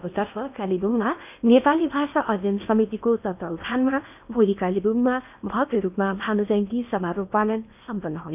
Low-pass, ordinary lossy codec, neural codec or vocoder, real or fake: 3.6 kHz; none; codec, 16 kHz in and 24 kHz out, 0.8 kbps, FocalCodec, streaming, 65536 codes; fake